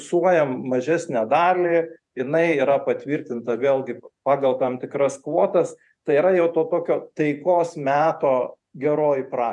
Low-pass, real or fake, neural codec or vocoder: 10.8 kHz; fake; vocoder, 48 kHz, 128 mel bands, Vocos